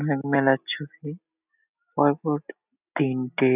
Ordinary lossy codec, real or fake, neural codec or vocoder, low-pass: none; real; none; 3.6 kHz